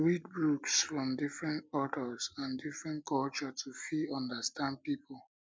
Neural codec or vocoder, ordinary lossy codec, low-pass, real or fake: none; none; none; real